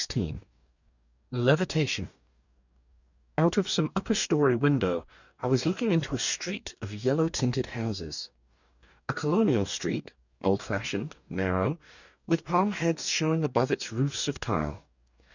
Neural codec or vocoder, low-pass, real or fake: codec, 44.1 kHz, 2.6 kbps, DAC; 7.2 kHz; fake